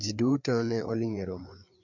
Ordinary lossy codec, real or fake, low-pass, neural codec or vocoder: MP3, 64 kbps; fake; 7.2 kHz; codec, 16 kHz, 4 kbps, FreqCodec, larger model